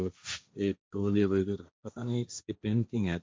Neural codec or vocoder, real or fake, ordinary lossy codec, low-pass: codec, 16 kHz, 1.1 kbps, Voila-Tokenizer; fake; none; none